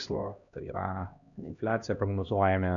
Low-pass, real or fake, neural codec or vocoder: 7.2 kHz; fake; codec, 16 kHz, 1 kbps, X-Codec, HuBERT features, trained on LibriSpeech